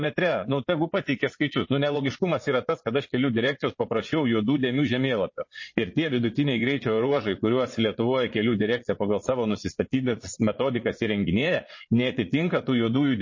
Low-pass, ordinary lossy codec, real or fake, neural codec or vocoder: 7.2 kHz; MP3, 32 kbps; fake; vocoder, 22.05 kHz, 80 mel bands, Vocos